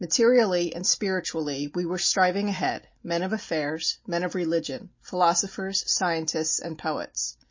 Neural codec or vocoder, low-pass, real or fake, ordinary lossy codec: none; 7.2 kHz; real; MP3, 32 kbps